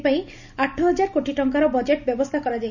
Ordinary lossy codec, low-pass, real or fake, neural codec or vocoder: none; 7.2 kHz; real; none